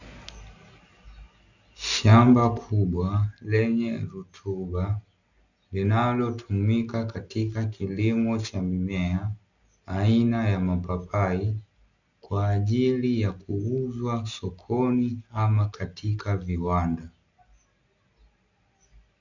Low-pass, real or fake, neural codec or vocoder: 7.2 kHz; real; none